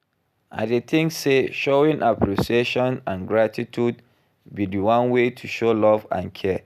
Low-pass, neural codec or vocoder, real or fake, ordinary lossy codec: 14.4 kHz; none; real; none